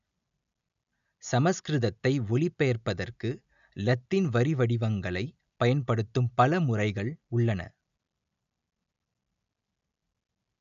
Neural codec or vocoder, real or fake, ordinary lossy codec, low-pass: none; real; none; 7.2 kHz